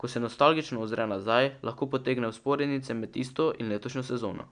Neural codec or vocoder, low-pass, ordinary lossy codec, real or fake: none; 9.9 kHz; none; real